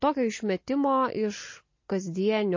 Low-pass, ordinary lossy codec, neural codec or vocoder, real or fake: 7.2 kHz; MP3, 32 kbps; none; real